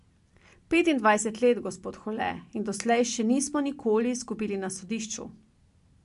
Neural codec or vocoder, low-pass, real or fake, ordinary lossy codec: none; 10.8 kHz; real; MP3, 64 kbps